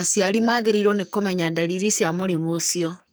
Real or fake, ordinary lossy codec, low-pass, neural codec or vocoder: fake; none; none; codec, 44.1 kHz, 2.6 kbps, SNAC